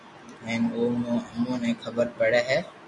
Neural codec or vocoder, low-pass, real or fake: none; 10.8 kHz; real